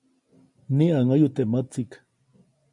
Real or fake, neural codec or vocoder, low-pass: real; none; 10.8 kHz